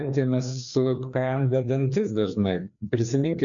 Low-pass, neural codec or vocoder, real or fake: 7.2 kHz; codec, 16 kHz, 2 kbps, FreqCodec, larger model; fake